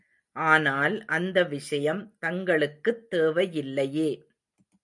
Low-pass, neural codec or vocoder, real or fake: 10.8 kHz; none; real